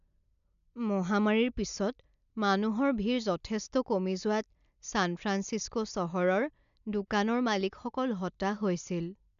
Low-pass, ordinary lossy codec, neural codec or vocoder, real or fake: 7.2 kHz; AAC, 96 kbps; none; real